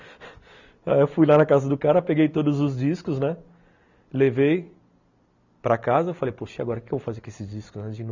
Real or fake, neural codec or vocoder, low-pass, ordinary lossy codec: real; none; 7.2 kHz; none